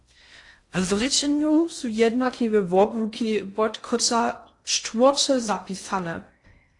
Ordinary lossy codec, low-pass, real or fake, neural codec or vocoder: AAC, 48 kbps; 10.8 kHz; fake; codec, 16 kHz in and 24 kHz out, 0.6 kbps, FocalCodec, streaming, 2048 codes